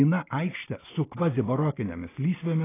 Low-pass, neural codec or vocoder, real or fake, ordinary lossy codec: 3.6 kHz; none; real; AAC, 16 kbps